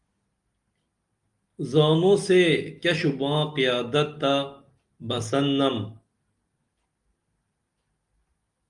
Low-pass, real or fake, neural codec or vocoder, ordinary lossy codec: 10.8 kHz; real; none; Opus, 32 kbps